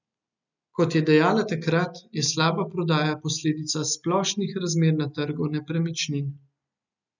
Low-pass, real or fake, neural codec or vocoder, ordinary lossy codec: 7.2 kHz; real; none; none